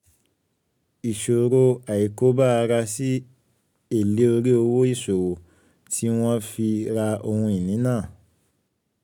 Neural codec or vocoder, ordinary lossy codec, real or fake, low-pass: none; none; real; 19.8 kHz